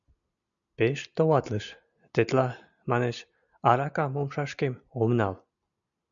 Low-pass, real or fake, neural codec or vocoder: 7.2 kHz; real; none